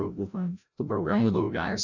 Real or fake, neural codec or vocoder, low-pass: fake; codec, 16 kHz, 0.5 kbps, FreqCodec, larger model; 7.2 kHz